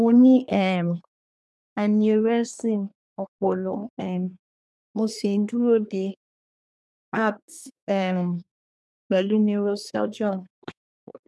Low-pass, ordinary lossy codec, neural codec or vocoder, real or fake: none; none; codec, 24 kHz, 1 kbps, SNAC; fake